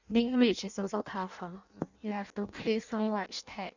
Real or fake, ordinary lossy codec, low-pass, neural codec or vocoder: fake; none; 7.2 kHz; codec, 16 kHz in and 24 kHz out, 0.6 kbps, FireRedTTS-2 codec